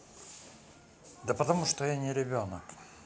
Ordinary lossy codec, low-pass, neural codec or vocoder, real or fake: none; none; none; real